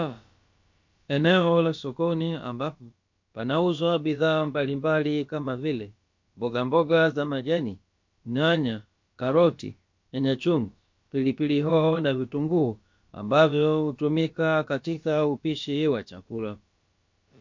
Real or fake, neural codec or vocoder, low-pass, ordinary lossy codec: fake; codec, 16 kHz, about 1 kbps, DyCAST, with the encoder's durations; 7.2 kHz; MP3, 48 kbps